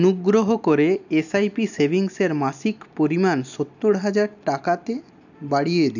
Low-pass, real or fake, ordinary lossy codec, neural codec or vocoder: 7.2 kHz; real; none; none